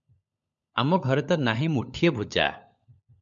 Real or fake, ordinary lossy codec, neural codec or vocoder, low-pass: fake; MP3, 64 kbps; codec, 16 kHz, 16 kbps, FunCodec, trained on LibriTTS, 50 frames a second; 7.2 kHz